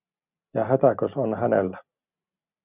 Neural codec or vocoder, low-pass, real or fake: none; 3.6 kHz; real